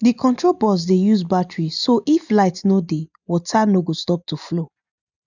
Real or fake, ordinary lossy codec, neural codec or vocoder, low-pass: real; none; none; 7.2 kHz